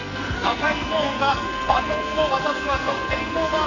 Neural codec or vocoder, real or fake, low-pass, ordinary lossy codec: codec, 44.1 kHz, 2.6 kbps, SNAC; fake; 7.2 kHz; none